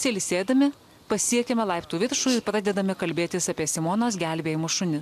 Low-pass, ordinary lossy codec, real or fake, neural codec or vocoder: 14.4 kHz; AAC, 96 kbps; real; none